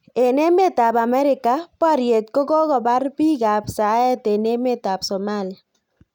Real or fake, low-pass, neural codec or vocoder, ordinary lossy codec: real; 19.8 kHz; none; none